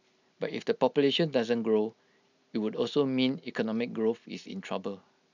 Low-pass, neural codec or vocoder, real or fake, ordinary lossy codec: 7.2 kHz; none; real; none